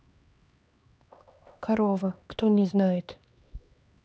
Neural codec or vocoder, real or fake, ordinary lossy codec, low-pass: codec, 16 kHz, 2 kbps, X-Codec, HuBERT features, trained on LibriSpeech; fake; none; none